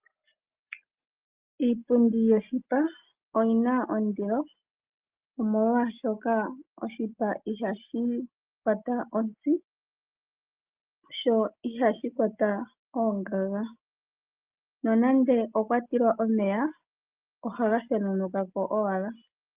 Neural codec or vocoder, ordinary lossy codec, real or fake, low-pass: none; Opus, 32 kbps; real; 3.6 kHz